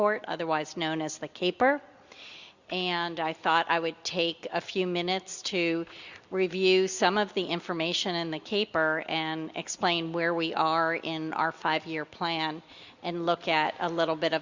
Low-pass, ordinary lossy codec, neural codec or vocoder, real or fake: 7.2 kHz; Opus, 64 kbps; none; real